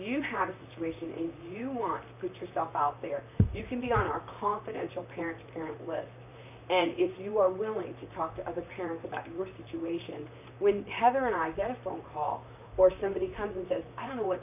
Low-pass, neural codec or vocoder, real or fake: 3.6 kHz; vocoder, 44.1 kHz, 128 mel bands, Pupu-Vocoder; fake